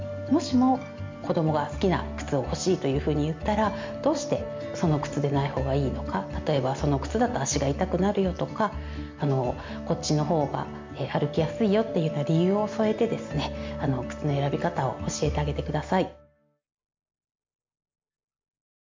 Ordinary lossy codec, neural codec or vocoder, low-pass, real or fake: MP3, 64 kbps; none; 7.2 kHz; real